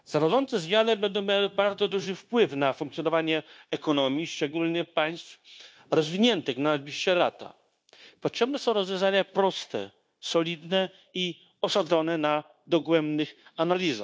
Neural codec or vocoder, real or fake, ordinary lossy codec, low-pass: codec, 16 kHz, 0.9 kbps, LongCat-Audio-Codec; fake; none; none